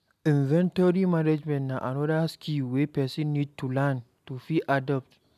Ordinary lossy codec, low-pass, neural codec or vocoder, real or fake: none; 14.4 kHz; none; real